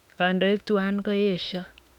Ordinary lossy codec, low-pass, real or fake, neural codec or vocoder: none; 19.8 kHz; fake; autoencoder, 48 kHz, 32 numbers a frame, DAC-VAE, trained on Japanese speech